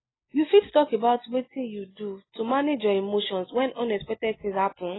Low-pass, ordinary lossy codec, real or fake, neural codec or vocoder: 7.2 kHz; AAC, 16 kbps; real; none